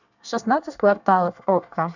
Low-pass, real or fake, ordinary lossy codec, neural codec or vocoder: 7.2 kHz; fake; none; codec, 24 kHz, 1 kbps, SNAC